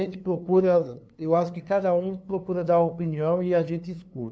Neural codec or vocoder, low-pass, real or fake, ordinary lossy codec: codec, 16 kHz, 2 kbps, FunCodec, trained on LibriTTS, 25 frames a second; none; fake; none